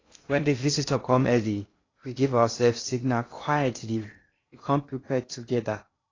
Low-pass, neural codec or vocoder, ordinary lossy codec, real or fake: 7.2 kHz; codec, 16 kHz in and 24 kHz out, 0.6 kbps, FocalCodec, streaming, 2048 codes; AAC, 32 kbps; fake